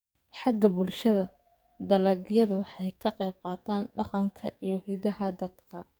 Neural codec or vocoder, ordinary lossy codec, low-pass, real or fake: codec, 44.1 kHz, 2.6 kbps, SNAC; none; none; fake